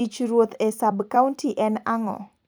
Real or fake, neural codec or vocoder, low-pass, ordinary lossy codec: fake; vocoder, 44.1 kHz, 128 mel bands every 256 samples, BigVGAN v2; none; none